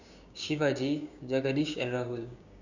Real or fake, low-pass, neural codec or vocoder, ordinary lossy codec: fake; 7.2 kHz; codec, 16 kHz, 6 kbps, DAC; none